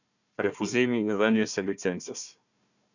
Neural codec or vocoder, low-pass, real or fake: codec, 16 kHz, 1 kbps, FunCodec, trained on Chinese and English, 50 frames a second; 7.2 kHz; fake